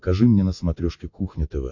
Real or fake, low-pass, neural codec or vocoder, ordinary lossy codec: real; 7.2 kHz; none; AAC, 48 kbps